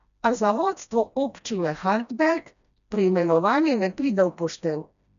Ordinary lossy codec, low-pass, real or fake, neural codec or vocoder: none; 7.2 kHz; fake; codec, 16 kHz, 1 kbps, FreqCodec, smaller model